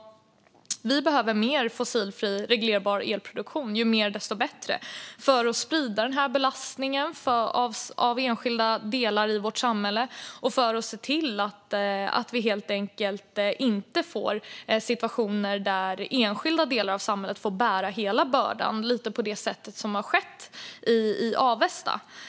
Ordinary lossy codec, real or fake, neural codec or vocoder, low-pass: none; real; none; none